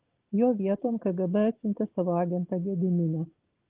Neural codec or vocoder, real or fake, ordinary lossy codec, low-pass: none; real; Opus, 16 kbps; 3.6 kHz